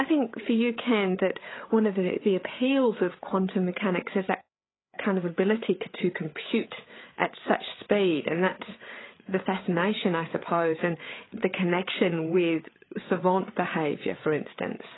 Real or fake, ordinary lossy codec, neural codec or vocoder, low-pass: fake; AAC, 16 kbps; codec, 16 kHz, 8 kbps, FreqCodec, larger model; 7.2 kHz